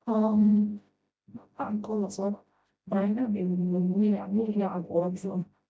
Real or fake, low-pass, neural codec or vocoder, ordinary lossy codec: fake; none; codec, 16 kHz, 0.5 kbps, FreqCodec, smaller model; none